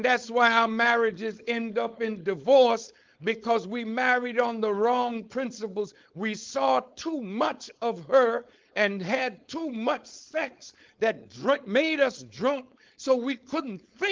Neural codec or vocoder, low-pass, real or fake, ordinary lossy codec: codec, 16 kHz, 4.8 kbps, FACodec; 7.2 kHz; fake; Opus, 32 kbps